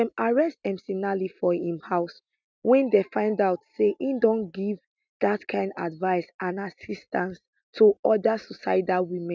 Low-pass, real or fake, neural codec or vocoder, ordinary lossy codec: none; real; none; none